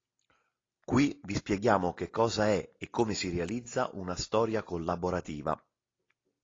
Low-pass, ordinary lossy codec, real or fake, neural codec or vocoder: 7.2 kHz; AAC, 32 kbps; real; none